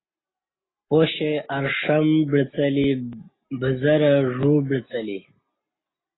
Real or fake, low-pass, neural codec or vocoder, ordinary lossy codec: real; 7.2 kHz; none; AAC, 16 kbps